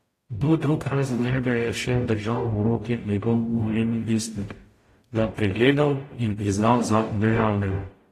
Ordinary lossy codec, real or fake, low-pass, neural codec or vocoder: AAC, 48 kbps; fake; 14.4 kHz; codec, 44.1 kHz, 0.9 kbps, DAC